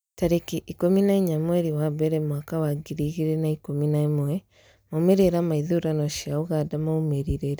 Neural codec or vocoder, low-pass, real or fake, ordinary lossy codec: none; none; real; none